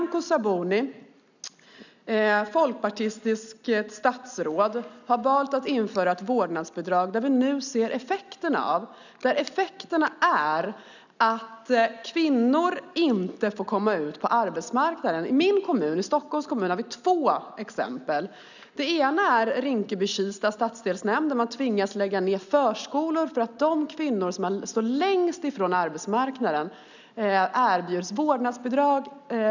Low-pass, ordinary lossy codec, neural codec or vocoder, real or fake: 7.2 kHz; none; none; real